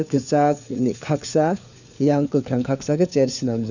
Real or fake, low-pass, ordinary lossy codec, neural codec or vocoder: fake; 7.2 kHz; none; codec, 16 kHz, 4 kbps, FunCodec, trained on LibriTTS, 50 frames a second